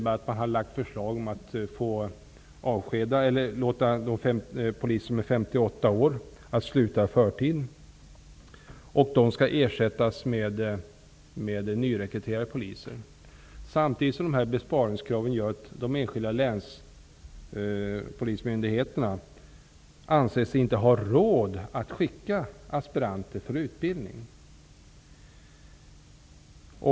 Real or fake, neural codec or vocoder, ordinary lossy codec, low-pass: real; none; none; none